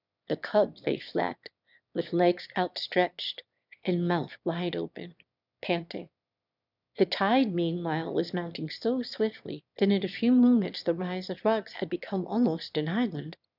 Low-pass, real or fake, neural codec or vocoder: 5.4 kHz; fake; autoencoder, 22.05 kHz, a latent of 192 numbers a frame, VITS, trained on one speaker